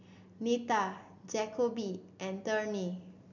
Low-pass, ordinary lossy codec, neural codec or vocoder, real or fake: 7.2 kHz; none; none; real